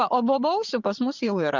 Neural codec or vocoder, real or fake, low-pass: vocoder, 44.1 kHz, 80 mel bands, Vocos; fake; 7.2 kHz